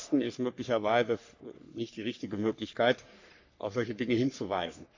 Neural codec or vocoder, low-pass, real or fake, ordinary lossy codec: codec, 44.1 kHz, 3.4 kbps, Pupu-Codec; 7.2 kHz; fake; AAC, 48 kbps